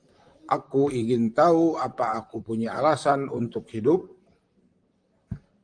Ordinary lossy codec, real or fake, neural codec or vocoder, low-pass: Opus, 32 kbps; fake; vocoder, 44.1 kHz, 128 mel bands, Pupu-Vocoder; 9.9 kHz